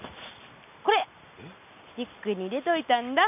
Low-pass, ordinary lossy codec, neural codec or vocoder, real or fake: 3.6 kHz; none; none; real